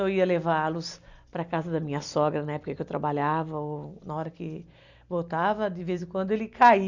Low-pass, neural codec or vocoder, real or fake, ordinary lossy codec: 7.2 kHz; none; real; none